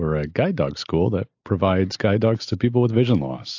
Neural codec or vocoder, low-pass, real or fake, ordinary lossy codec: none; 7.2 kHz; real; AAC, 48 kbps